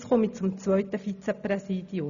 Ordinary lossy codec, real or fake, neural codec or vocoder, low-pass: none; real; none; 7.2 kHz